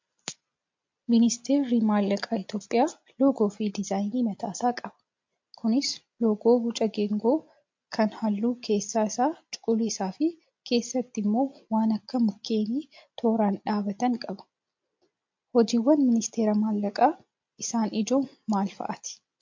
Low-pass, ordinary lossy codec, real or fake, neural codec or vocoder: 7.2 kHz; MP3, 48 kbps; real; none